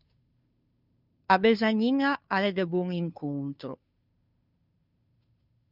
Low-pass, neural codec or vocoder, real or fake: 5.4 kHz; codec, 16 kHz, 2 kbps, FunCodec, trained on Chinese and English, 25 frames a second; fake